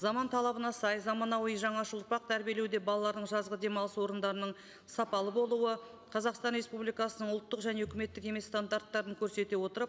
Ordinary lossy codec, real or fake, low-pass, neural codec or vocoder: none; real; none; none